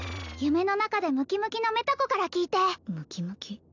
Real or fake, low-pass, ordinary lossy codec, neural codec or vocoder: real; 7.2 kHz; none; none